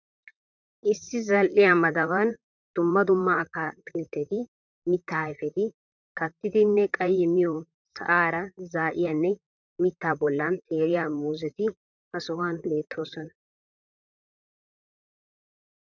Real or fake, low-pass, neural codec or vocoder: fake; 7.2 kHz; vocoder, 44.1 kHz, 128 mel bands, Pupu-Vocoder